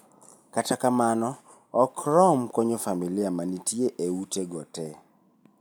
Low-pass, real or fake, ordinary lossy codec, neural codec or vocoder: none; real; none; none